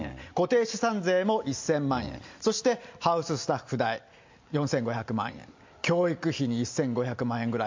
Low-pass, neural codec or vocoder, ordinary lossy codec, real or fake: 7.2 kHz; none; MP3, 64 kbps; real